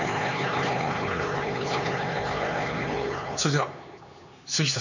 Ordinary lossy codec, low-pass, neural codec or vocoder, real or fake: none; 7.2 kHz; codec, 16 kHz, 4 kbps, X-Codec, HuBERT features, trained on LibriSpeech; fake